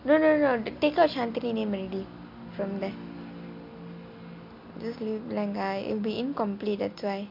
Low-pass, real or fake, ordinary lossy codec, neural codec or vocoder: 5.4 kHz; real; MP3, 48 kbps; none